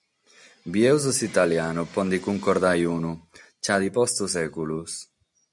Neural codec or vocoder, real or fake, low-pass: none; real; 10.8 kHz